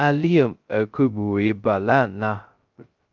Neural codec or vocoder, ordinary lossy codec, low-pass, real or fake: codec, 16 kHz, 0.2 kbps, FocalCodec; Opus, 32 kbps; 7.2 kHz; fake